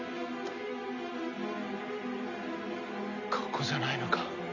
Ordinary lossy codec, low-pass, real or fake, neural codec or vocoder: none; 7.2 kHz; real; none